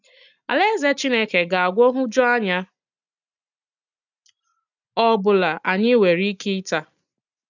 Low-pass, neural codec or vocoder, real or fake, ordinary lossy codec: 7.2 kHz; none; real; none